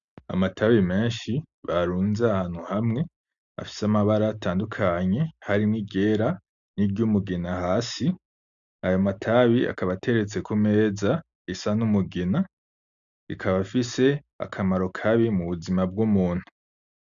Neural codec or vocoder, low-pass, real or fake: none; 7.2 kHz; real